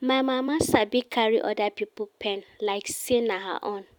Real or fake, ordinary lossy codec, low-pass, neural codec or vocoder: fake; none; 19.8 kHz; vocoder, 44.1 kHz, 128 mel bands every 256 samples, BigVGAN v2